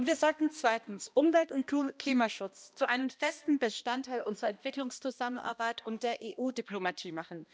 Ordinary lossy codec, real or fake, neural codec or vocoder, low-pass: none; fake; codec, 16 kHz, 1 kbps, X-Codec, HuBERT features, trained on balanced general audio; none